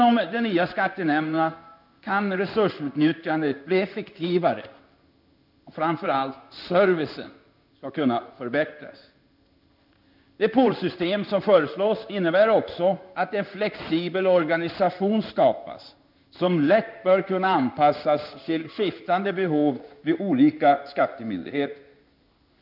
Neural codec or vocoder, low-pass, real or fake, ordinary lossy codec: codec, 16 kHz in and 24 kHz out, 1 kbps, XY-Tokenizer; 5.4 kHz; fake; none